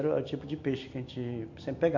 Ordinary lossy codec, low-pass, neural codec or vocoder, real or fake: AAC, 48 kbps; 7.2 kHz; none; real